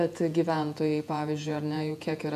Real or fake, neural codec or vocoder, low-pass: fake; vocoder, 48 kHz, 128 mel bands, Vocos; 14.4 kHz